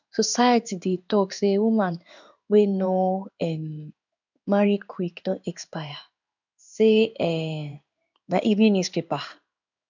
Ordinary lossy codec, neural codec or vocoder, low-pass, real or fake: none; codec, 16 kHz in and 24 kHz out, 1 kbps, XY-Tokenizer; 7.2 kHz; fake